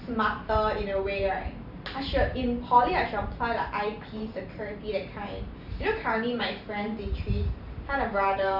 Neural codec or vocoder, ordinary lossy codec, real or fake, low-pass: vocoder, 44.1 kHz, 128 mel bands every 512 samples, BigVGAN v2; none; fake; 5.4 kHz